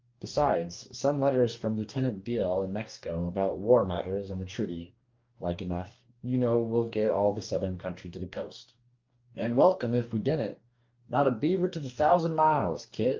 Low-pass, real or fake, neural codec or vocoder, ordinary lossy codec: 7.2 kHz; fake; codec, 44.1 kHz, 2.6 kbps, DAC; Opus, 24 kbps